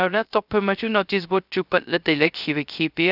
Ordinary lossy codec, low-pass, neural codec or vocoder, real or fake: none; 5.4 kHz; codec, 16 kHz, 0.3 kbps, FocalCodec; fake